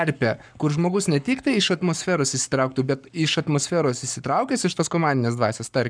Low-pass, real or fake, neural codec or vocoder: 9.9 kHz; fake; codec, 44.1 kHz, 7.8 kbps, Pupu-Codec